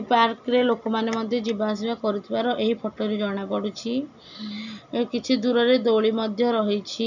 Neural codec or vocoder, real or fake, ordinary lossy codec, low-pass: none; real; none; 7.2 kHz